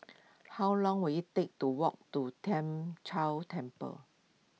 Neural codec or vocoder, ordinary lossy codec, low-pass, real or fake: none; none; none; real